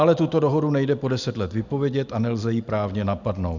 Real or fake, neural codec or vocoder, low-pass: real; none; 7.2 kHz